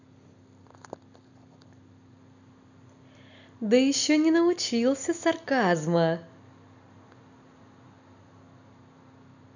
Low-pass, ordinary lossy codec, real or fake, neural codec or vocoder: 7.2 kHz; none; real; none